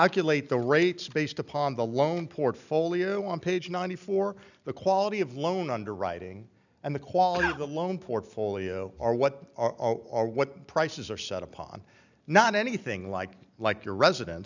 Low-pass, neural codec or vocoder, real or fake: 7.2 kHz; none; real